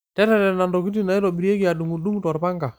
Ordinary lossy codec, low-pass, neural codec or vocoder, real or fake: none; none; none; real